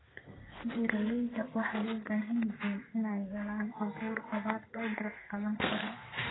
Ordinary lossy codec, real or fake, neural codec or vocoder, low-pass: AAC, 16 kbps; fake; codec, 32 kHz, 1.9 kbps, SNAC; 7.2 kHz